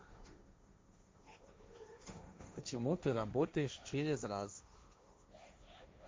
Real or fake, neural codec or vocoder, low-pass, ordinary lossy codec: fake; codec, 16 kHz, 1.1 kbps, Voila-Tokenizer; none; none